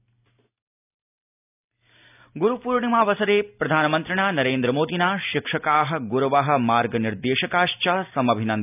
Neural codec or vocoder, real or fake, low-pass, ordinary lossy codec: none; real; 3.6 kHz; none